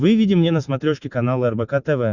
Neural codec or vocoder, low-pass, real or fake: none; 7.2 kHz; real